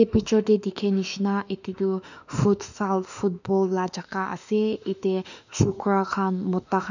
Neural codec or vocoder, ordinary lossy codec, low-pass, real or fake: autoencoder, 48 kHz, 32 numbers a frame, DAC-VAE, trained on Japanese speech; none; 7.2 kHz; fake